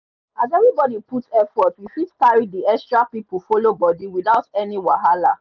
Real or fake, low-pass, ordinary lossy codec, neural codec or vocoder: real; 7.2 kHz; none; none